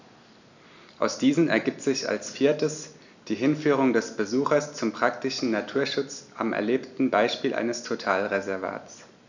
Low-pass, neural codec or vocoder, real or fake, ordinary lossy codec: 7.2 kHz; none; real; none